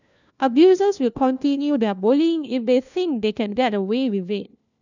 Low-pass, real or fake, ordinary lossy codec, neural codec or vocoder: 7.2 kHz; fake; none; codec, 16 kHz, 1 kbps, FunCodec, trained on LibriTTS, 50 frames a second